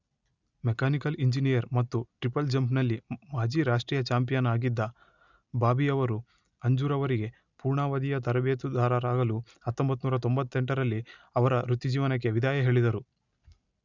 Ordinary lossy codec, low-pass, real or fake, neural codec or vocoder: none; 7.2 kHz; real; none